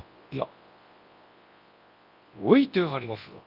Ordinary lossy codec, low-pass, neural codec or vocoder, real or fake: Opus, 24 kbps; 5.4 kHz; codec, 24 kHz, 0.9 kbps, WavTokenizer, large speech release; fake